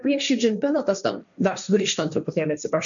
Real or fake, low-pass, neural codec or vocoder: fake; 7.2 kHz; codec, 16 kHz, 1.1 kbps, Voila-Tokenizer